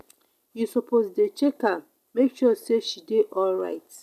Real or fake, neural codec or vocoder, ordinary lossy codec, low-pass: real; none; none; 14.4 kHz